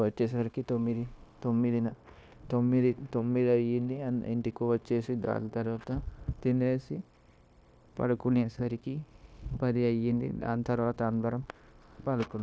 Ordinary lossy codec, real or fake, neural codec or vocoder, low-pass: none; fake; codec, 16 kHz, 0.9 kbps, LongCat-Audio-Codec; none